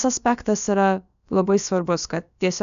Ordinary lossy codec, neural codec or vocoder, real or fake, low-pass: AAC, 96 kbps; codec, 16 kHz, about 1 kbps, DyCAST, with the encoder's durations; fake; 7.2 kHz